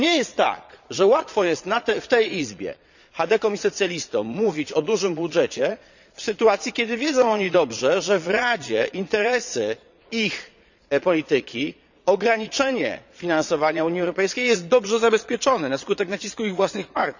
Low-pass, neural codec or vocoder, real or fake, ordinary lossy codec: 7.2 kHz; vocoder, 22.05 kHz, 80 mel bands, Vocos; fake; none